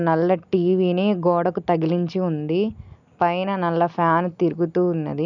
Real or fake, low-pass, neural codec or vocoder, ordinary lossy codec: real; 7.2 kHz; none; none